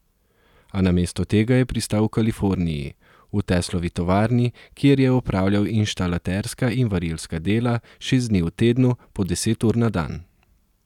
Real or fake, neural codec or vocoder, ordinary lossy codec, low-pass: real; none; none; 19.8 kHz